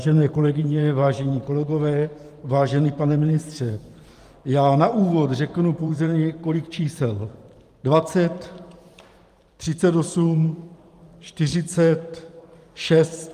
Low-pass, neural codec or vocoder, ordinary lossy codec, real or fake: 14.4 kHz; vocoder, 44.1 kHz, 128 mel bands every 512 samples, BigVGAN v2; Opus, 32 kbps; fake